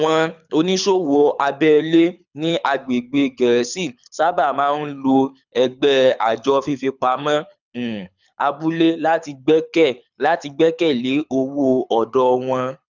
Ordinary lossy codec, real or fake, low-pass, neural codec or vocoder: none; fake; 7.2 kHz; codec, 24 kHz, 6 kbps, HILCodec